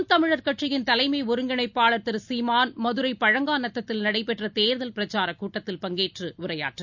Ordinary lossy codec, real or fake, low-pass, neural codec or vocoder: none; real; 7.2 kHz; none